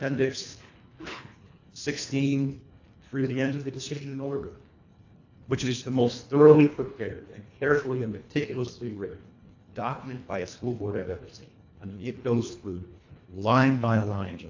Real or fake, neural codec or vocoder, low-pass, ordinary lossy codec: fake; codec, 24 kHz, 1.5 kbps, HILCodec; 7.2 kHz; AAC, 48 kbps